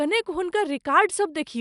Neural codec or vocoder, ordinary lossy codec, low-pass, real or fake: none; none; 10.8 kHz; real